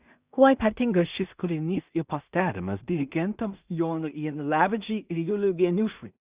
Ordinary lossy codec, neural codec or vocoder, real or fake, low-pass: Opus, 64 kbps; codec, 16 kHz in and 24 kHz out, 0.4 kbps, LongCat-Audio-Codec, two codebook decoder; fake; 3.6 kHz